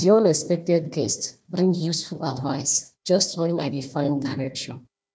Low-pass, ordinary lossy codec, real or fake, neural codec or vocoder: none; none; fake; codec, 16 kHz, 1 kbps, FunCodec, trained on Chinese and English, 50 frames a second